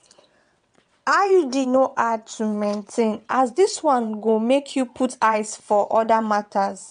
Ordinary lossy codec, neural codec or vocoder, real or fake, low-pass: MP3, 64 kbps; vocoder, 22.05 kHz, 80 mel bands, WaveNeXt; fake; 9.9 kHz